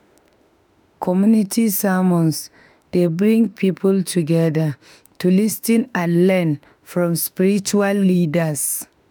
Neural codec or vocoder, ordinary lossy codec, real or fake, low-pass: autoencoder, 48 kHz, 32 numbers a frame, DAC-VAE, trained on Japanese speech; none; fake; none